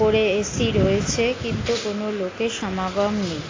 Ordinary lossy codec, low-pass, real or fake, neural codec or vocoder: AAC, 32 kbps; 7.2 kHz; real; none